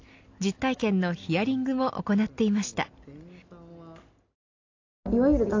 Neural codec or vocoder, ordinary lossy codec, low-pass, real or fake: none; AAC, 48 kbps; 7.2 kHz; real